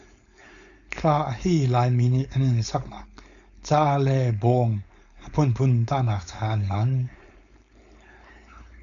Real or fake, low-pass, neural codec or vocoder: fake; 7.2 kHz; codec, 16 kHz, 4.8 kbps, FACodec